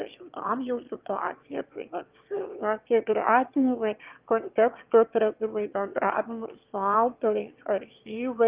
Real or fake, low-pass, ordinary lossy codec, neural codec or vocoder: fake; 3.6 kHz; Opus, 24 kbps; autoencoder, 22.05 kHz, a latent of 192 numbers a frame, VITS, trained on one speaker